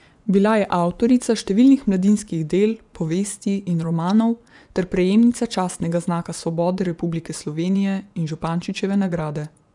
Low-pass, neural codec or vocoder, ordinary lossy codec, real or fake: 10.8 kHz; vocoder, 24 kHz, 100 mel bands, Vocos; none; fake